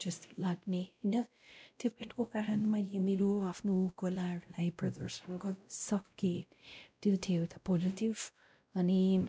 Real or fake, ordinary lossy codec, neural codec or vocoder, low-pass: fake; none; codec, 16 kHz, 0.5 kbps, X-Codec, WavLM features, trained on Multilingual LibriSpeech; none